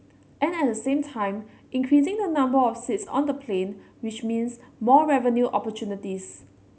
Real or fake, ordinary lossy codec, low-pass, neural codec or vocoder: real; none; none; none